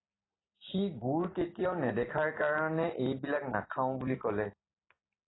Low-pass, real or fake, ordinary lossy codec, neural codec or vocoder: 7.2 kHz; real; AAC, 16 kbps; none